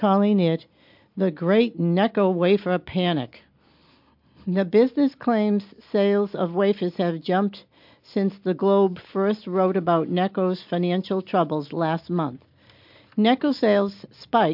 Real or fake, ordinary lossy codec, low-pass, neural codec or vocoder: real; MP3, 48 kbps; 5.4 kHz; none